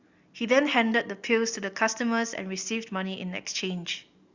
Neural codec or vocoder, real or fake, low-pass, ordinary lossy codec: none; real; 7.2 kHz; Opus, 64 kbps